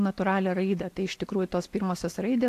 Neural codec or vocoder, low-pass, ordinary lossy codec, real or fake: none; 14.4 kHz; AAC, 64 kbps; real